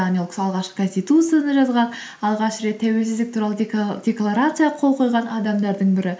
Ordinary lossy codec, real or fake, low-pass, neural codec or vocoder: none; real; none; none